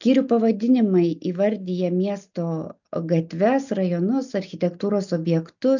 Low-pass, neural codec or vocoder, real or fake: 7.2 kHz; none; real